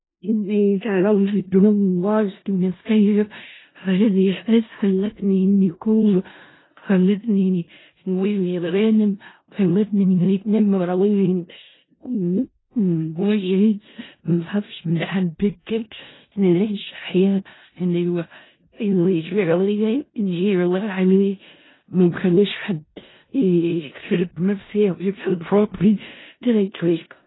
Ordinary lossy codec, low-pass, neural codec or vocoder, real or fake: AAC, 16 kbps; 7.2 kHz; codec, 16 kHz in and 24 kHz out, 0.4 kbps, LongCat-Audio-Codec, four codebook decoder; fake